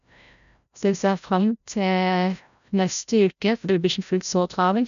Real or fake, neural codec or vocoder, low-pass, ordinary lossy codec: fake; codec, 16 kHz, 0.5 kbps, FreqCodec, larger model; 7.2 kHz; none